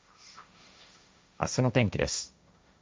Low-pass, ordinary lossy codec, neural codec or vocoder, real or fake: none; none; codec, 16 kHz, 1.1 kbps, Voila-Tokenizer; fake